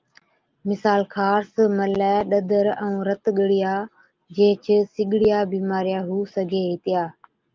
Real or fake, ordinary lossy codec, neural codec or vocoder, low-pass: real; Opus, 24 kbps; none; 7.2 kHz